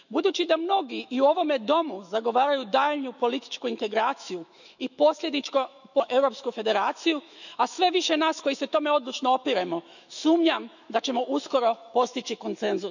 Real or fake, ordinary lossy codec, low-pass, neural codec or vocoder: fake; none; 7.2 kHz; autoencoder, 48 kHz, 128 numbers a frame, DAC-VAE, trained on Japanese speech